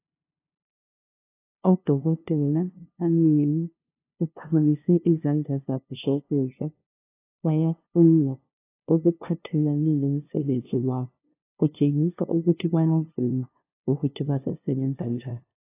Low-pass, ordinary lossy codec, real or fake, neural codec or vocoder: 3.6 kHz; AAC, 24 kbps; fake; codec, 16 kHz, 0.5 kbps, FunCodec, trained on LibriTTS, 25 frames a second